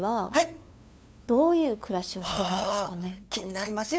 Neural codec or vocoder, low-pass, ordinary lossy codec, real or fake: codec, 16 kHz, 2 kbps, FunCodec, trained on LibriTTS, 25 frames a second; none; none; fake